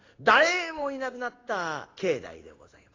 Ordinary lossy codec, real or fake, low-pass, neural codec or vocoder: AAC, 32 kbps; fake; 7.2 kHz; codec, 16 kHz in and 24 kHz out, 1 kbps, XY-Tokenizer